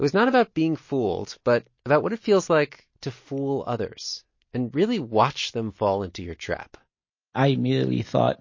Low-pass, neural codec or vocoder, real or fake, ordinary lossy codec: 7.2 kHz; none; real; MP3, 32 kbps